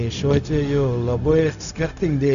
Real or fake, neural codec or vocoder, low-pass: fake; codec, 16 kHz, 0.4 kbps, LongCat-Audio-Codec; 7.2 kHz